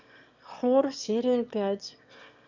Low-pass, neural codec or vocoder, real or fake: 7.2 kHz; autoencoder, 22.05 kHz, a latent of 192 numbers a frame, VITS, trained on one speaker; fake